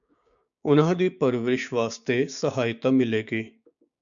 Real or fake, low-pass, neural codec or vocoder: fake; 7.2 kHz; codec, 16 kHz, 6 kbps, DAC